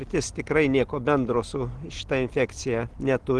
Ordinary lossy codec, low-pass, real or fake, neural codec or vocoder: Opus, 16 kbps; 10.8 kHz; real; none